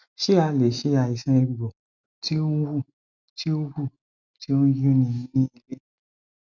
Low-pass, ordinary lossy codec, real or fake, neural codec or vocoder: 7.2 kHz; none; real; none